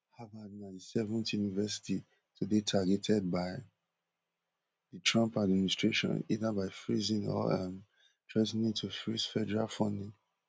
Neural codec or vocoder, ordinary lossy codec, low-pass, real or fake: none; none; none; real